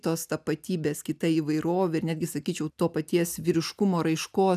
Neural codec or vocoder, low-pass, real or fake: none; 14.4 kHz; real